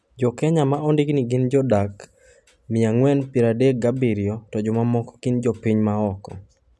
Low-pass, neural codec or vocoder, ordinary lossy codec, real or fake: none; none; none; real